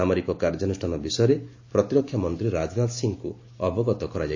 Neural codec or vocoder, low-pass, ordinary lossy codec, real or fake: none; 7.2 kHz; AAC, 48 kbps; real